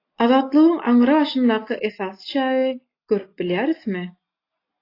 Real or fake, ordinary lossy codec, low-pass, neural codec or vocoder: real; AAC, 32 kbps; 5.4 kHz; none